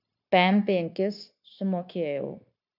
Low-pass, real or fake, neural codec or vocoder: 5.4 kHz; fake; codec, 16 kHz, 0.9 kbps, LongCat-Audio-Codec